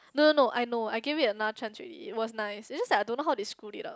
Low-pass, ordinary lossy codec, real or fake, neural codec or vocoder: none; none; real; none